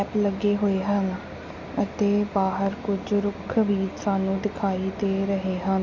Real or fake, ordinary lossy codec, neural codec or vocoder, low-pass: real; MP3, 48 kbps; none; 7.2 kHz